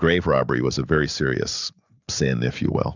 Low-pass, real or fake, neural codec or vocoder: 7.2 kHz; real; none